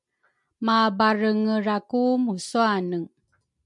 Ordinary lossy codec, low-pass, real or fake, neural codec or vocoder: MP3, 64 kbps; 10.8 kHz; real; none